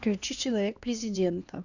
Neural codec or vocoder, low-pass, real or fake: codec, 16 kHz, 2 kbps, X-Codec, HuBERT features, trained on LibriSpeech; 7.2 kHz; fake